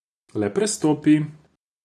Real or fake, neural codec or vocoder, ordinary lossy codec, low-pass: real; none; none; none